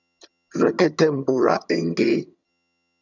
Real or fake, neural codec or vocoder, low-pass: fake; vocoder, 22.05 kHz, 80 mel bands, HiFi-GAN; 7.2 kHz